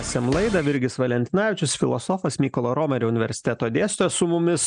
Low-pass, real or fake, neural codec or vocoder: 9.9 kHz; real; none